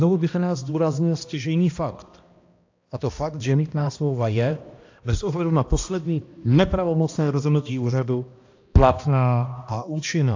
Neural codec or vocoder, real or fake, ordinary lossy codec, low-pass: codec, 16 kHz, 1 kbps, X-Codec, HuBERT features, trained on balanced general audio; fake; AAC, 48 kbps; 7.2 kHz